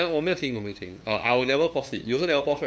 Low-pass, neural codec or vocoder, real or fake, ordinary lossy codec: none; codec, 16 kHz, 2 kbps, FunCodec, trained on LibriTTS, 25 frames a second; fake; none